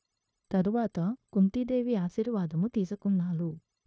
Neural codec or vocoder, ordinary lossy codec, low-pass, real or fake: codec, 16 kHz, 0.9 kbps, LongCat-Audio-Codec; none; none; fake